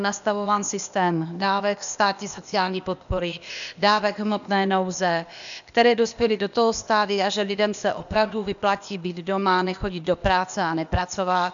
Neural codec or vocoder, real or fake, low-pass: codec, 16 kHz, 0.8 kbps, ZipCodec; fake; 7.2 kHz